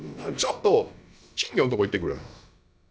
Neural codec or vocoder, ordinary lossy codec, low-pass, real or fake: codec, 16 kHz, about 1 kbps, DyCAST, with the encoder's durations; none; none; fake